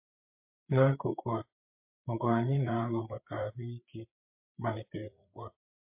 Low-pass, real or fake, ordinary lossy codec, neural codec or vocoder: 3.6 kHz; fake; MP3, 32 kbps; codec, 16 kHz, 8 kbps, FreqCodec, larger model